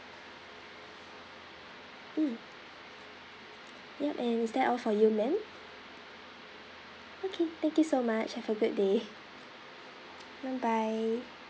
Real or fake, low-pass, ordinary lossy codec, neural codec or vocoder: real; none; none; none